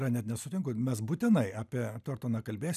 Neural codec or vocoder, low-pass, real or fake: none; 14.4 kHz; real